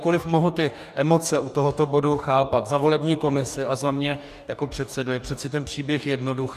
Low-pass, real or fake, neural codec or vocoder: 14.4 kHz; fake; codec, 44.1 kHz, 2.6 kbps, DAC